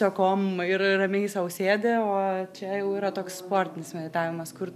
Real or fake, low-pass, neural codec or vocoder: real; 14.4 kHz; none